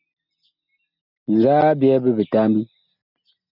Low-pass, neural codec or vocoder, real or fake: 5.4 kHz; none; real